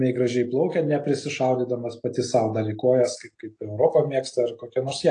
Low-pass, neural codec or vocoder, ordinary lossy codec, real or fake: 9.9 kHz; none; AAC, 48 kbps; real